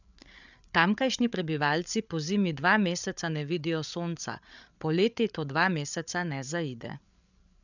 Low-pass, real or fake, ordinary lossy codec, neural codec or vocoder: 7.2 kHz; fake; none; codec, 16 kHz, 8 kbps, FreqCodec, larger model